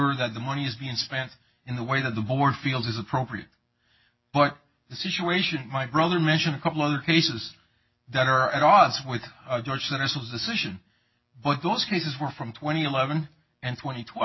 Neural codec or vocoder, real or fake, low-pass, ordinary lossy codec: none; real; 7.2 kHz; MP3, 24 kbps